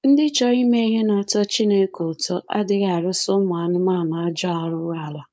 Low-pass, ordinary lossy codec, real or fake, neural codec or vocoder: none; none; fake; codec, 16 kHz, 4.8 kbps, FACodec